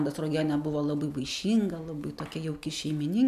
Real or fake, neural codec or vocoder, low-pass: real; none; 14.4 kHz